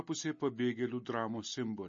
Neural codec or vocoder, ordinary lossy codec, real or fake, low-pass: none; MP3, 32 kbps; real; 7.2 kHz